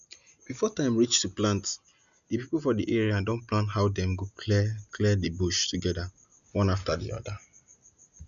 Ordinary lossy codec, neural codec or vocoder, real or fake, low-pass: none; none; real; 7.2 kHz